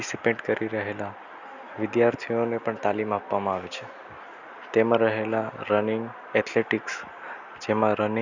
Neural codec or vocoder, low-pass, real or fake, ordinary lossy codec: none; 7.2 kHz; real; none